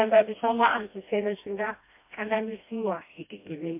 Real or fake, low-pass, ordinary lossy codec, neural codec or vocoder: fake; 3.6 kHz; MP3, 32 kbps; codec, 16 kHz, 1 kbps, FreqCodec, smaller model